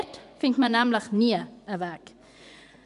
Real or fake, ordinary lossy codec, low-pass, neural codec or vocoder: fake; none; 10.8 kHz; vocoder, 24 kHz, 100 mel bands, Vocos